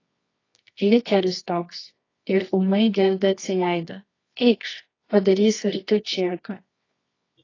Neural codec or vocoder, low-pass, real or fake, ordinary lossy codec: codec, 24 kHz, 0.9 kbps, WavTokenizer, medium music audio release; 7.2 kHz; fake; AAC, 32 kbps